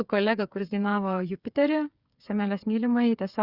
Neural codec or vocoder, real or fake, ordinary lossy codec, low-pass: codec, 16 kHz, 8 kbps, FreqCodec, smaller model; fake; Opus, 64 kbps; 5.4 kHz